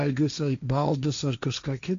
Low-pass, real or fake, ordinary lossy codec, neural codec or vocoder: 7.2 kHz; fake; AAC, 64 kbps; codec, 16 kHz, 1.1 kbps, Voila-Tokenizer